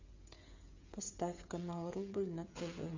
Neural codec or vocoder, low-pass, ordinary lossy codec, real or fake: codec, 16 kHz, 16 kbps, FreqCodec, smaller model; 7.2 kHz; MP3, 64 kbps; fake